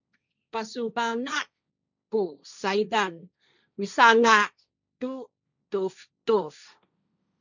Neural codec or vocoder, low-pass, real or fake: codec, 16 kHz, 1.1 kbps, Voila-Tokenizer; 7.2 kHz; fake